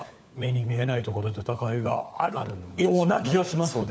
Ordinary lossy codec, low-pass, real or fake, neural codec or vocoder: none; none; fake; codec, 16 kHz, 4 kbps, FunCodec, trained on LibriTTS, 50 frames a second